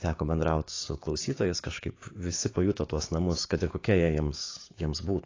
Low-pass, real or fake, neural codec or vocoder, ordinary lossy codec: 7.2 kHz; fake; codec, 16 kHz, 6 kbps, DAC; AAC, 32 kbps